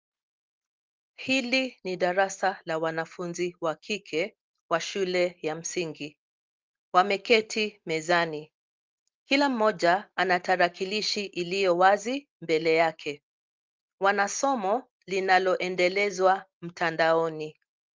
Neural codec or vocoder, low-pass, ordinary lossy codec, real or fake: none; 7.2 kHz; Opus, 32 kbps; real